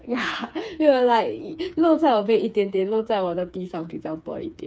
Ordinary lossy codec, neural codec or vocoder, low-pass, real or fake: none; codec, 16 kHz, 4 kbps, FreqCodec, smaller model; none; fake